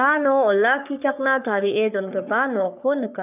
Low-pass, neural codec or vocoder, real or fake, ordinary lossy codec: 3.6 kHz; codec, 44.1 kHz, 3.4 kbps, Pupu-Codec; fake; none